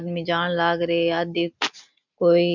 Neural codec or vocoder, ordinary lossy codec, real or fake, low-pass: none; none; real; 7.2 kHz